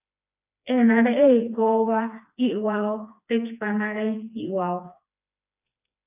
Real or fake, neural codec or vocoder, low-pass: fake; codec, 16 kHz, 2 kbps, FreqCodec, smaller model; 3.6 kHz